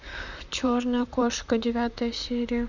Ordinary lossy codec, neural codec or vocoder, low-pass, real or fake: none; vocoder, 44.1 kHz, 128 mel bands, Pupu-Vocoder; 7.2 kHz; fake